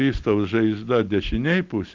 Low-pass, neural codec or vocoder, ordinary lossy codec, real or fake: 7.2 kHz; none; Opus, 32 kbps; real